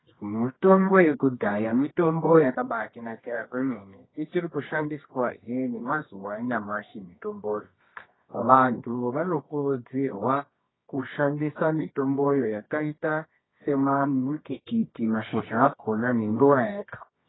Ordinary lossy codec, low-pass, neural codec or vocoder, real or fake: AAC, 16 kbps; 7.2 kHz; codec, 24 kHz, 0.9 kbps, WavTokenizer, medium music audio release; fake